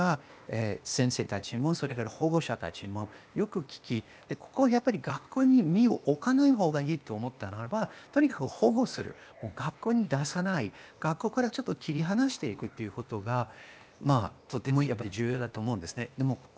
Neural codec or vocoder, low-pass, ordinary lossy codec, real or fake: codec, 16 kHz, 0.8 kbps, ZipCodec; none; none; fake